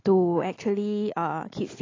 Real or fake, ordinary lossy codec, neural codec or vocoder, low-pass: real; AAC, 32 kbps; none; 7.2 kHz